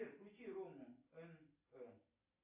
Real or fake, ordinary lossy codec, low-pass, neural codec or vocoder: real; AAC, 32 kbps; 3.6 kHz; none